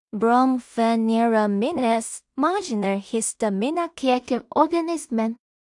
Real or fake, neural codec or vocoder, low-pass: fake; codec, 16 kHz in and 24 kHz out, 0.4 kbps, LongCat-Audio-Codec, two codebook decoder; 10.8 kHz